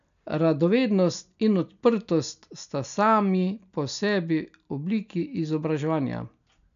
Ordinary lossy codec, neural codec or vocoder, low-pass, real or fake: none; none; 7.2 kHz; real